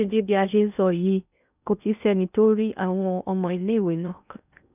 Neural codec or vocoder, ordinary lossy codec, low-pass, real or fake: codec, 16 kHz in and 24 kHz out, 0.6 kbps, FocalCodec, streaming, 2048 codes; none; 3.6 kHz; fake